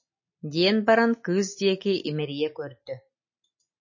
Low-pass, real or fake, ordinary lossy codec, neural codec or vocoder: 7.2 kHz; real; MP3, 32 kbps; none